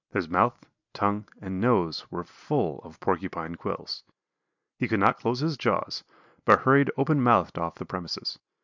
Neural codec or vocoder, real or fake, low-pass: none; real; 7.2 kHz